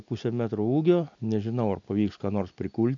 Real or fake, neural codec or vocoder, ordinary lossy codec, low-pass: real; none; MP3, 64 kbps; 7.2 kHz